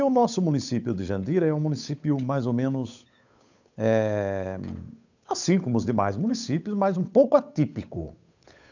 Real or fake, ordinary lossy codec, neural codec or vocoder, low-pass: fake; none; codec, 16 kHz, 8 kbps, FunCodec, trained on Chinese and English, 25 frames a second; 7.2 kHz